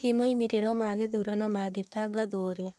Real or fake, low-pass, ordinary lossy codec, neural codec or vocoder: fake; none; none; codec, 24 kHz, 1 kbps, SNAC